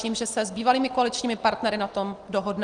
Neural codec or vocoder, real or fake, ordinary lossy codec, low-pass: none; real; Opus, 24 kbps; 9.9 kHz